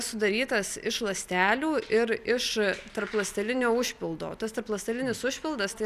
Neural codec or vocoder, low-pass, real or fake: vocoder, 44.1 kHz, 128 mel bands every 256 samples, BigVGAN v2; 14.4 kHz; fake